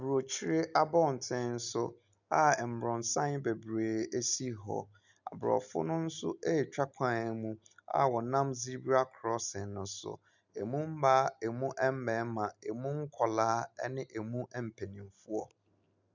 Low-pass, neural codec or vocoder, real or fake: 7.2 kHz; none; real